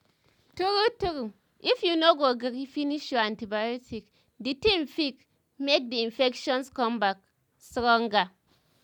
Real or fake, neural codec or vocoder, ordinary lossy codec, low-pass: real; none; none; 19.8 kHz